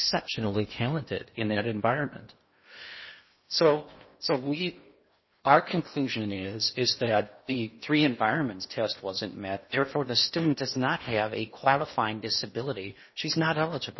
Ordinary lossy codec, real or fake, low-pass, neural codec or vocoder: MP3, 24 kbps; fake; 7.2 kHz; codec, 16 kHz in and 24 kHz out, 0.8 kbps, FocalCodec, streaming, 65536 codes